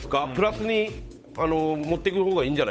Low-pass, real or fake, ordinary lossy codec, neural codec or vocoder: none; fake; none; codec, 16 kHz, 8 kbps, FunCodec, trained on Chinese and English, 25 frames a second